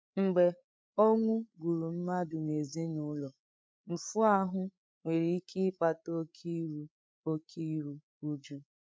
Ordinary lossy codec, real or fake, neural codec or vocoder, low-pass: none; fake; codec, 16 kHz, 8 kbps, FreqCodec, larger model; none